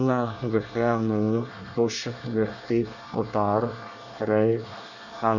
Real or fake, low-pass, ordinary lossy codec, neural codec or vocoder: fake; 7.2 kHz; none; codec, 24 kHz, 1 kbps, SNAC